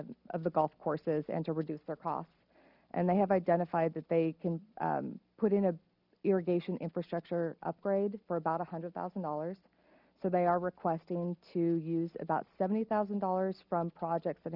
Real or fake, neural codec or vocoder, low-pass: real; none; 5.4 kHz